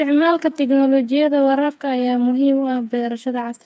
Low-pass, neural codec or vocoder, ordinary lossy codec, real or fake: none; codec, 16 kHz, 4 kbps, FreqCodec, smaller model; none; fake